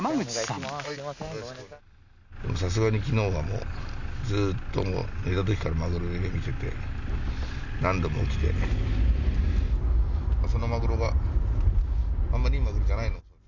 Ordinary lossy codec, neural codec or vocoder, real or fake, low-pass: none; none; real; 7.2 kHz